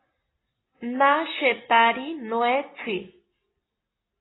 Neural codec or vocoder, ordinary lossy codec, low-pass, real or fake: none; AAC, 16 kbps; 7.2 kHz; real